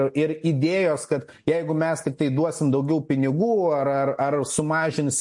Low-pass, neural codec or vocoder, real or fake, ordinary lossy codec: 10.8 kHz; none; real; MP3, 48 kbps